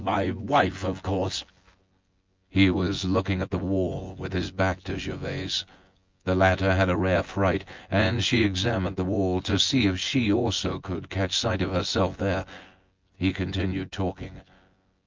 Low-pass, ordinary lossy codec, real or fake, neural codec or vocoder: 7.2 kHz; Opus, 32 kbps; fake; vocoder, 24 kHz, 100 mel bands, Vocos